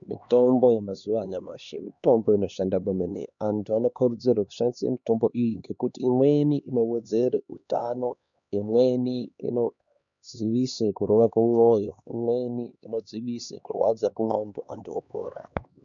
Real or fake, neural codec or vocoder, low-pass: fake; codec, 16 kHz, 2 kbps, X-Codec, HuBERT features, trained on LibriSpeech; 7.2 kHz